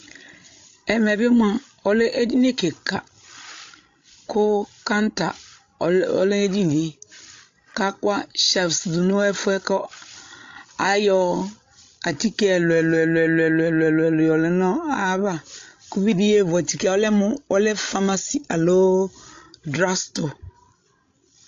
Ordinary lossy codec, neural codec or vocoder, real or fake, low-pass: AAC, 48 kbps; codec, 16 kHz, 16 kbps, FreqCodec, larger model; fake; 7.2 kHz